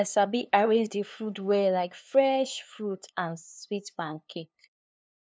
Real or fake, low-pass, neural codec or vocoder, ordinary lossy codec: fake; none; codec, 16 kHz, 2 kbps, FunCodec, trained on LibriTTS, 25 frames a second; none